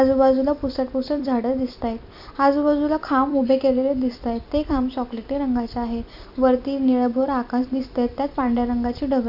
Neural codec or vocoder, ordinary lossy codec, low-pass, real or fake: vocoder, 44.1 kHz, 128 mel bands every 256 samples, BigVGAN v2; none; 5.4 kHz; fake